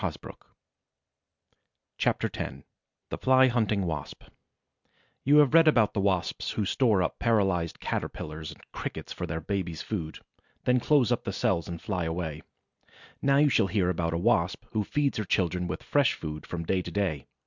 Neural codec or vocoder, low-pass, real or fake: none; 7.2 kHz; real